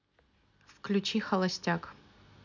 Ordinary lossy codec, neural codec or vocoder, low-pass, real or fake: none; none; 7.2 kHz; real